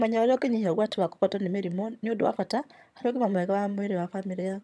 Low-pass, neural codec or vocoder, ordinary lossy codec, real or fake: none; vocoder, 22.05 kHz, 80 mel bands, HiFi-GAN; none; fake